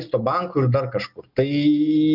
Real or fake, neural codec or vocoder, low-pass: real; none; 5.4 kHz